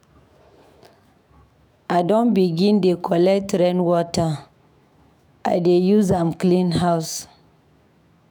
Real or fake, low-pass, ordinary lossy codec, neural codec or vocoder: fake; none; none; autoencoder, 48 kHz, 128 numbers a frame, DAC-VAE, trained on Japanese speech